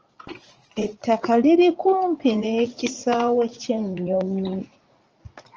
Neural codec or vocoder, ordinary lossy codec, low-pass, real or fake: vocoder, 44.1 kHz, 80 mel bands, Vocos; Opus, 24 kbps; 7.2 kHz; fake